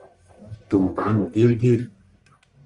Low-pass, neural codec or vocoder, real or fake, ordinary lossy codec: 10.8 kHz; codec, 44.1 kHz, 1.7 kbps, Pupu-Codec; fake; Opus, 64 kbps